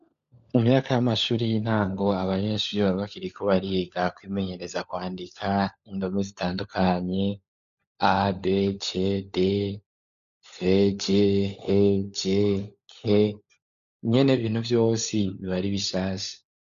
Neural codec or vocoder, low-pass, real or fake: codec, 16 kHz, 16 kbps, FunCodec, trained on LibriTTS, 50 frames a second; 7.2 kHz; fake